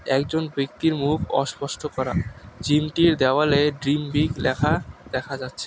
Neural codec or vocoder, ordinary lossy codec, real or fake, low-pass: none; none; real; none